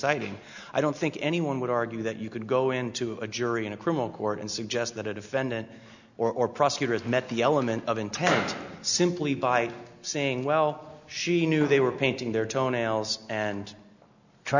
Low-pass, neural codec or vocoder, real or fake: 7.2 kHz; none; real